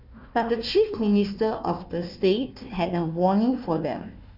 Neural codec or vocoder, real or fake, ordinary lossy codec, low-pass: codec, 16 kHz, 1 kbps, FunCodec, trained on Chinese and English, 50 frames a second; fake; none; 5.4 kHz